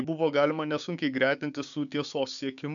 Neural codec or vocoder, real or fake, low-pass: codec, 16 kHz, 6 kbps, DAC; fake; 7.2 kHz